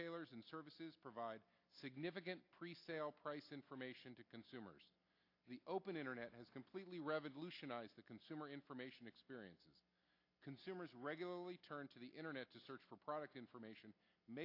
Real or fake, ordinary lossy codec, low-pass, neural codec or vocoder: real; AAC, 32 kbps; 5.4 kHz; none